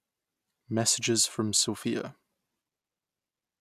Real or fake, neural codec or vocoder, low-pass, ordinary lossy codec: real; none; 14.4 kHz; AAC, 96 kbps